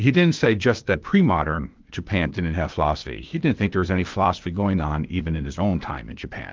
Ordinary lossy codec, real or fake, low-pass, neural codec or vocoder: Opus, 24 kbps; fake; 7.2 kHz; codec, 16 kHz, 0.8 kbps, ZipCodec